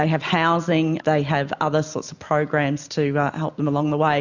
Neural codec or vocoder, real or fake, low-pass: none; real; 7.2 kHz